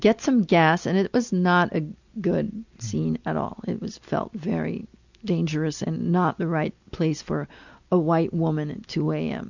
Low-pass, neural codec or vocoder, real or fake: 7.2 kHz; none; real